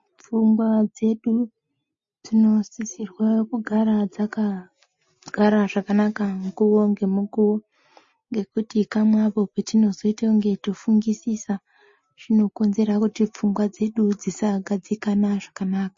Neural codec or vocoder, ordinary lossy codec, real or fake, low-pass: none; MP3, 32 kbps; real; 7.2 kHz